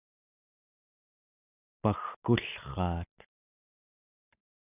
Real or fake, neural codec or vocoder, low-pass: real; none; 3.6 kHz